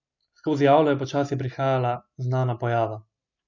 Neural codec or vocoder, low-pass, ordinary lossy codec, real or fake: none; 7.2 kHz; none; real